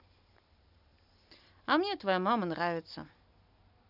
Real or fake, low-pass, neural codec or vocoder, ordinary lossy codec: real; 5.4 kHz; none; none